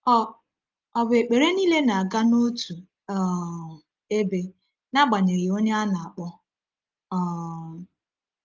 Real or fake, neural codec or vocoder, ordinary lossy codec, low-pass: real; none; Opus, 32 kbps; 7.2 kHz